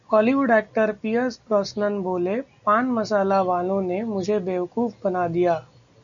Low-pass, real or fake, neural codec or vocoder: 7.2 kHz; real; none